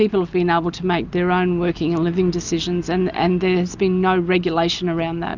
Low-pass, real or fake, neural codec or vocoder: 7.2 kHz; real; none